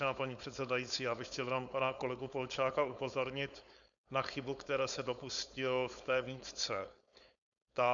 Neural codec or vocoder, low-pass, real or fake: codec, 16 kHz, 4.8 kbps, FACodec; 7.2 kHz; fake